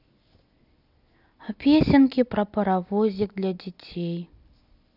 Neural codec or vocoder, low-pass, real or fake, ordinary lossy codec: none; 5.4 kHz; real; none